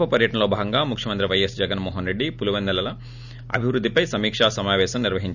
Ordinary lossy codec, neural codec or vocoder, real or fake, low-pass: none; none; real; 7.2 kHz